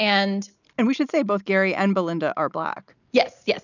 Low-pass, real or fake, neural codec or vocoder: 7.2 kHz; real; none